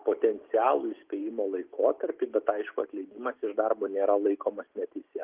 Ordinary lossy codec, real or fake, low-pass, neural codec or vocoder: Opus, 32 kbps; real; 3.6 kHz; none